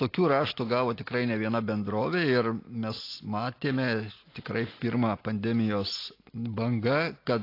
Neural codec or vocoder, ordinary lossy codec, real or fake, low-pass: none; AAC, 32 kbps; real; 5.4 kHz